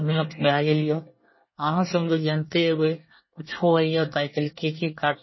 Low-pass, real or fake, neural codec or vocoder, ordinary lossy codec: 7.2 kHz; fake; codec, 24 kHz, 1 kbps, SNAC; MP3, 24 kbps